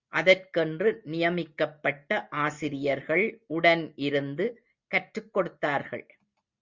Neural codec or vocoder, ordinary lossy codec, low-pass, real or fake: none; Opus, 64 kbps; 7.2 kHz; real